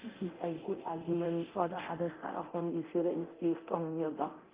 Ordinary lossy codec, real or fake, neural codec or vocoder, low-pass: Opus, 32 kbps; fake; codec, 24 kHz, 0.9 kbps, DualCodec; 3.6 kHz